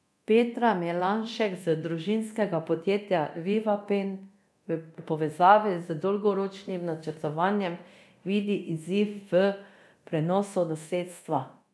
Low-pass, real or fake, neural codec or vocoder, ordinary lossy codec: none; fake; codec, 24 kHz, 0.9 kbps, DualCodec; none